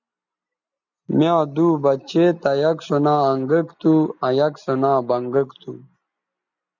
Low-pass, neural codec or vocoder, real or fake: 7.2 kHz; none; real